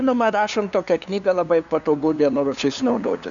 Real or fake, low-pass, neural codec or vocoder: fake; 7.2 kHz; codec, 16 kHz, 2 kbps, FunCodec, trained on LibriTTS, 25 frames a second